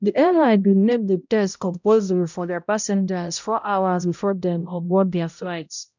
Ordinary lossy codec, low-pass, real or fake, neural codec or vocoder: none; 7.2 kHz; fake; codec, 16 kHz, 0.5 kbps, X-Codec, HuBERT features, trained on balanced general audio